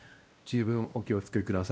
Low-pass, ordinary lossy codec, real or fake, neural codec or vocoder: none; none; fake; codec, 16 kHz, 1 kbps, X-Codec, WavLM features, trained on Multilingual LibriSpeech